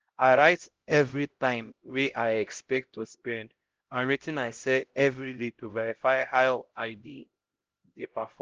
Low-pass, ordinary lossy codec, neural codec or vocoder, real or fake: 7.2 kHz; Opus, 16 kbps; codec, 16 kHz, 0.5 kbps, X-Codec, HuBERT features, trained on LibriSpeech; fake